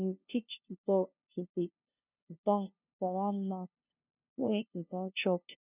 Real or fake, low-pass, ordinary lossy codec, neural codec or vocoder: fake; 3.6 kHz; none; codec, 16 kHz, 0.5 kbps, FunCodec, trained on LibriTTS, 25 frames a second